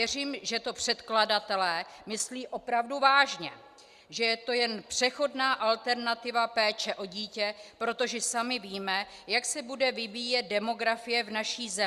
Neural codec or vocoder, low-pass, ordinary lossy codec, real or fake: none; 14.4 kHz; Opus, 64 kbps; real